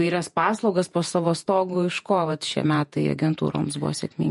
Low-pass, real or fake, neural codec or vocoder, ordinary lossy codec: 14.4 kHz; fake; vocoder, 48 kHz, 128 mel bands, Vocos; MP3, 48 kbps